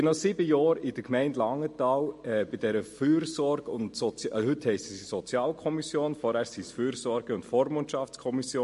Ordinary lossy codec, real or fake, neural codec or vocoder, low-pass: MP3, 48 kbps; real; none; 14.4 kHz